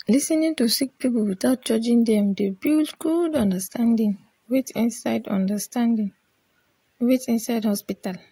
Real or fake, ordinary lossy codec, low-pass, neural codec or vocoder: real; AAC, 48 kbps; 19.8 kHz; none